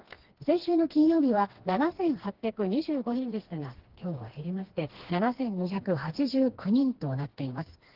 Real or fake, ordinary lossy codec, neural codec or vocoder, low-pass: fake; Opus, 16 kbps; codec, 16 kHz, 2 kbps, FreqCodec, smaller model; 5.4 kHz